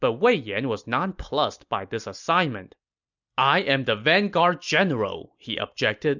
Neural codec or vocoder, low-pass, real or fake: none; 7.2 kHz; real